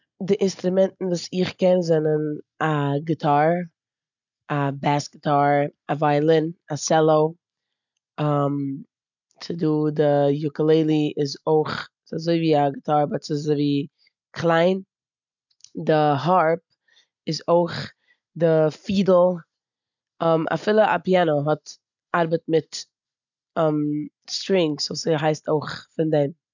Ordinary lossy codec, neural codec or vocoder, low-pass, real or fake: none; none; 7.2 kHz; real